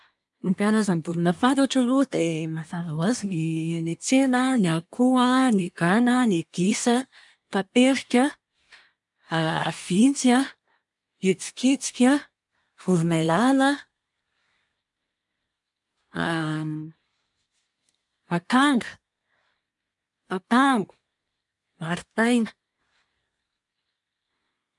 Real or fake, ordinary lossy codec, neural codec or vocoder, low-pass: fake; none; codec, 24 kHz, 1 kbps, SNAC; 10.8 kHz